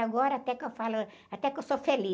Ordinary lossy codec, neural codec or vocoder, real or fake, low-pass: none; none; real; none